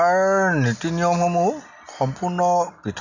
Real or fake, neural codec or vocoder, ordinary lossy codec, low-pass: real; none; none; 7.2 kHz